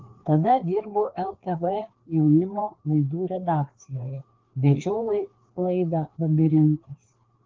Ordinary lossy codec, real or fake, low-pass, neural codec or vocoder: Opus, 16 kbps; fake; 7.2 kHz; codec, 16 kHz, 2 kbps, FreqCodec, larger model